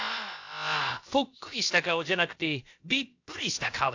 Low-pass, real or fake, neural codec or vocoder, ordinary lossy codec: 7.2 kHz; fake; codec, 16 kHz, about 1 kbps, DyCAST, with the encoder's durations; AAC, 48 kbps